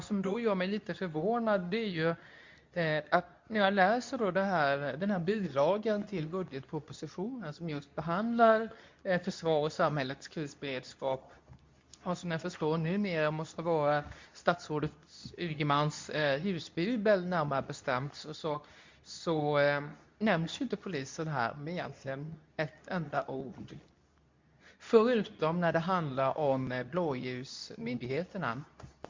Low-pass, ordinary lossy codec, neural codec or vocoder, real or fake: 7.2 kHz; MP3, 64 kbps; codec, 24 kHz, 0.9 kbps, WavTokenizer, medium speech release version 2; fake